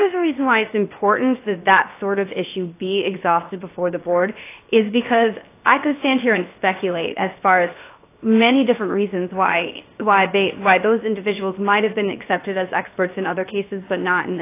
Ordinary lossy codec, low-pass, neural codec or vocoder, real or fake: AAC, 24 kbps; 3.6 kHz; codec, 16 kHz, 0.7 kbps, FocalCodec; fake